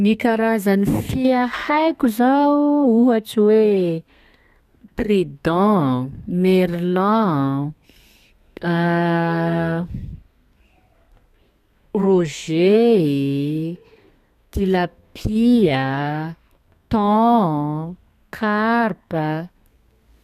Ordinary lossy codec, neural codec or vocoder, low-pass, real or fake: none; codec, 32 kHz, 1.9 kbps, SNAC; 14.4 kHz; fake